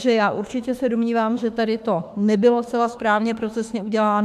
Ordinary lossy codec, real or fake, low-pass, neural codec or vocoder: AAC, 96 kbps; fake; 14.4 kHz; autoencoder, 48 kHz, 32 numbers a frame, DAC-VAE, trained on Japanese speech